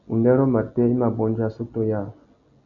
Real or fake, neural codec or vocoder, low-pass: real; none; 7.2 kHz